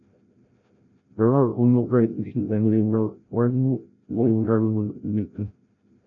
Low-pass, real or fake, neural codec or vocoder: 7.2 kHz; fake; codec, 16 kHz, 0.5 kbps, FreqCodec, larger model